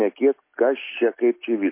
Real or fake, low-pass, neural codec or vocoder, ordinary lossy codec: real; 3.6 kHz; none; MP3, 24 kbps